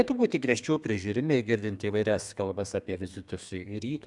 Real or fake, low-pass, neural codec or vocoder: fake; 10.8 kHz; codec, 32 kHz, 1.9 kbps, SNAC